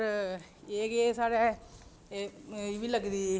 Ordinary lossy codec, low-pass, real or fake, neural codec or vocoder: none; none; real; none